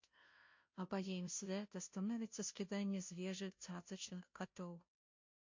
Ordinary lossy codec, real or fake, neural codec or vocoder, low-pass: MP3, 48 kbps; fake; codec, 16 kHz, 0.5 kbps, FunCodec, trained on Chinese and English, 25 frames a second; 7.2 kHz